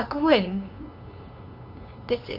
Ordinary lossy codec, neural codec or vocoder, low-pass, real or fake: none; codec, 16 kHz, 2 kbps, FunCodec, trained on LibriTTS, 25 frames a second; 5.4 kHz; fake